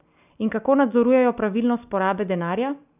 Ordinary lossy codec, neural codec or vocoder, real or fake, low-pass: none; none; real; 3.6 kHz